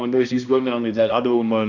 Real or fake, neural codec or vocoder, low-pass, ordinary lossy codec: fake; codec, 16 kHz, 1 kbps, X-Codec, HuBERT features, trained on balanced general audio; 7.2 kHz; none